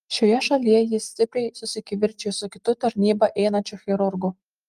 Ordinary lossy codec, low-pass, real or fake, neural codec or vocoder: Opus, 32 kbps; 14.4 kHz; real; none